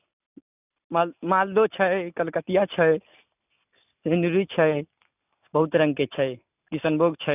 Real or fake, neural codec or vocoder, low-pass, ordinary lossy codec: real; none; 3.6 kHz; none